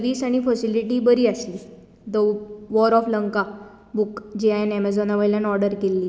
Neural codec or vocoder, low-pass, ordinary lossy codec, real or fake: none; none; none; real